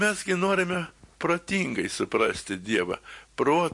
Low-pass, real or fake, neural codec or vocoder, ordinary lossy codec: 10.8 kHz; real; none; MP3, 48 kbps